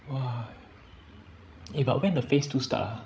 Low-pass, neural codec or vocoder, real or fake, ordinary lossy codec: none; codec, 16 kHz, 16 kbps, FreqCodec, larger model; fake; none